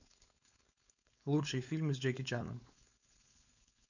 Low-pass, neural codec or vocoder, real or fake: 7.2 kHz; codec, 16 kHz, 4.8 kbps, FACodec; fake